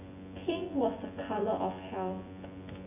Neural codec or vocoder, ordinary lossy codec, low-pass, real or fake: vocoder, 24 kHz, 100 mel bands, Vocos; MP3, 24 kbps; 3.6 kHz; fake